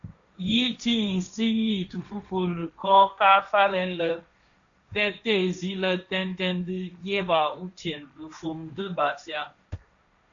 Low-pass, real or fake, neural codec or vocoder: 7.2 kHz; fake; codec, 16 kHz, 1.1 kbps, Voila-Tokenizer